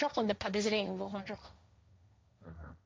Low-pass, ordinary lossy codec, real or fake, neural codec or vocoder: none; none; fake; codec, 16 kHz, 1.1 kbps, Voila-Tokenizer